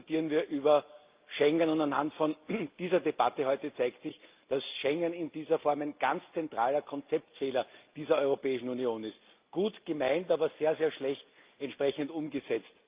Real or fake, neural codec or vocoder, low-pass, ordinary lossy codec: real; none; 3.6 kHz; Opus, 24 kbps